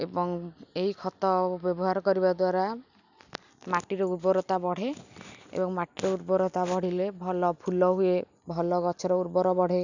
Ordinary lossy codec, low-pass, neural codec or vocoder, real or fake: none; 7.2 kHz; none; real